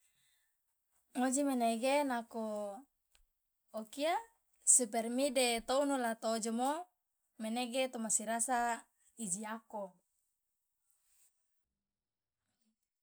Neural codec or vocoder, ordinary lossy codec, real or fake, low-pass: none; none; real; none